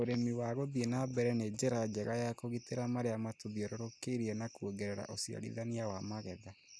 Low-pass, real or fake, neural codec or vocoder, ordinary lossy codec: none; real; none; none